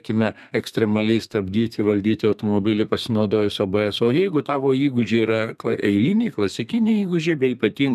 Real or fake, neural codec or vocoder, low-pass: fake; codec, 32 kHz, 1.9 kbps, SNAC; 14.4 kHz